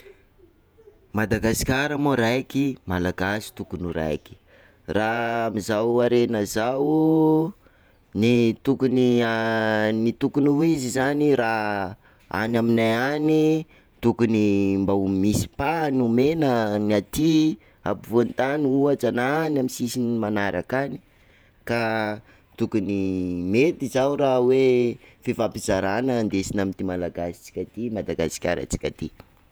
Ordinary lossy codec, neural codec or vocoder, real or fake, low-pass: none; vocoder, 48 kHz, 128 mel bands, Vocos; fake; none